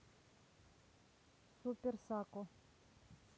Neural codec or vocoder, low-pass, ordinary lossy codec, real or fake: none; none; none; real